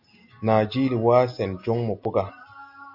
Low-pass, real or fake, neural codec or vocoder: 5.4 kHz; real; none